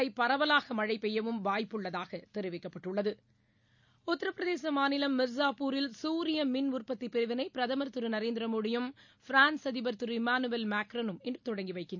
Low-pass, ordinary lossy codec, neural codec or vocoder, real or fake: 7.2 kHz; none; none; real